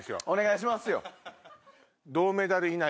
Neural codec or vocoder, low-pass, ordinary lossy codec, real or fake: none; none; none; real